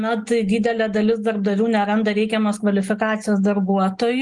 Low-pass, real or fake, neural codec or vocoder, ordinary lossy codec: 10.8 kHz; real; none; Opus, 32 kbps